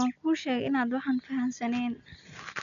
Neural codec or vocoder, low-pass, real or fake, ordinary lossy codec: none; 7.2 kHz; real; none